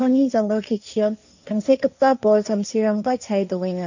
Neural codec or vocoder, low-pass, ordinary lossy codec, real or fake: codec, 16 kHz, 1.1 kbps, Voila-Tokenizer; 7.2 kHz; none; fake